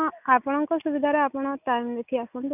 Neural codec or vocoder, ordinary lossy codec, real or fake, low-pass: none; none; real; 3.6 kHz